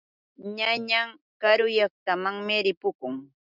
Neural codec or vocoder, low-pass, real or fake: none; 5.4 kHz; real